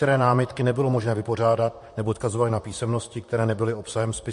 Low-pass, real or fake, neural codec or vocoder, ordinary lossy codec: 14.4 kHz; fake; vocoder, 44.1 kHz, 128 mel bands, Pupu-Vocoder; MP3, 48 kbps